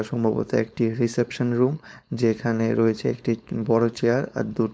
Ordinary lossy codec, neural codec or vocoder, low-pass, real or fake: none; codec, 16 kHz, 4.8 kbps, FACodec; none; fake